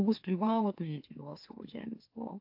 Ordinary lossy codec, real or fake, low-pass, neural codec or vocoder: AAC, 48 kbps; fake; 5.4 kHz; autoencoder, 44.1 kHz, a latent of 192 numbers a frame, MeloTTS